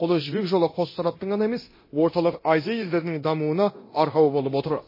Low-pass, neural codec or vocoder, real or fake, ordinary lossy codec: 5.4 kHz; codec, 24 kHz, 0.9 kbps, DualCodec; fake; MP3, 24 kbps